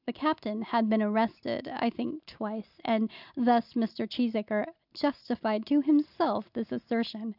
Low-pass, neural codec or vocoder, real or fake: 5.4 kHz; none; real